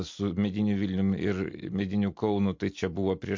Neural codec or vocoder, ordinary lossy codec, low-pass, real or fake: none; MP3, 48 kbps; 7.2 kHz; real